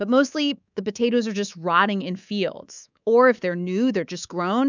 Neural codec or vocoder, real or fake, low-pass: codec, 24 kHz, 3.1 kbps, DualCodec; fake; 7.2 kHz